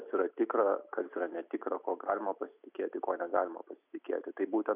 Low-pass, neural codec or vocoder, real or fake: 3.6 kHz; none; real